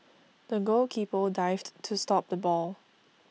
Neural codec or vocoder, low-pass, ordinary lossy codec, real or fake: none; none; none; real